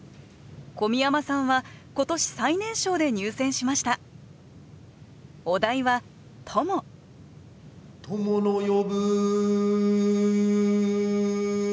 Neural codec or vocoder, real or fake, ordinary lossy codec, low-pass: none; real; none; none